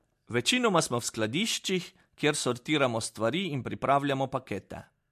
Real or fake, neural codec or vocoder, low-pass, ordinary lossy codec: real; none; 14.4 kHz; MP3, 64 kbps